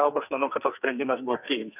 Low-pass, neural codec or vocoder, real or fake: 3.6 kHz; codec, 44.1 kHz, 2.6 kbps, SNAC; fake